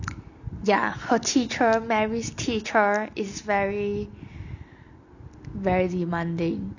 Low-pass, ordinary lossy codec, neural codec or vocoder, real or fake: 7.2 kHz; none; none; real